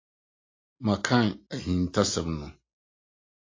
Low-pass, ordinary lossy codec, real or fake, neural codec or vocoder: 7.2 kHz; AAC, 32 kbps; real; none